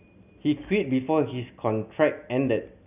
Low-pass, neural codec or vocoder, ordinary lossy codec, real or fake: 3.6 kHz; none; none; real